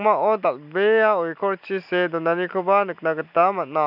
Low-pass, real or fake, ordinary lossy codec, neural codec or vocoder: 5.4 kHz; real; none; none